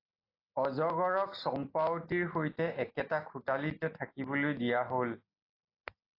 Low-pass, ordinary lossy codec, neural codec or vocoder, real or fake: 5.4 kHz; AAC, 32 kbps; none; real